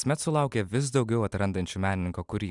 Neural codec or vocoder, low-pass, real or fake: none; 10.8 kHz; real